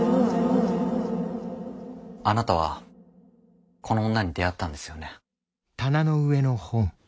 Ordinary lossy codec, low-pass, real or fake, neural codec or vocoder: none; none; real; none